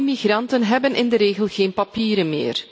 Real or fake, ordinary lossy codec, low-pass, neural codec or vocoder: real; none; none; none